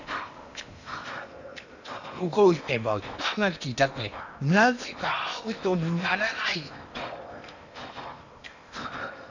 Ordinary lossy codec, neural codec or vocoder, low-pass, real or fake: none; codec, 16 kHz in and 24 kHz out, 0.8 kbps, FocalCodec, streaming, 65536 codes; 7.2 kHz; fake